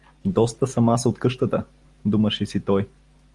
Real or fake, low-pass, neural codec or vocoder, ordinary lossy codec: real; 10.8 kHz; none; Opus, 24 kbps